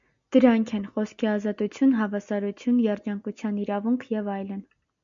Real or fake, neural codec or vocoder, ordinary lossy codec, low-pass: real; none; MP3, 64 kbps; 7.2 kHz